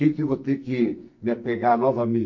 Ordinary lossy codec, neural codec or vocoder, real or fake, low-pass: MP3, 48 kbps; codec, 44.1 kHz, 2.6 kbps, SNAC; fake; 7.2 kHz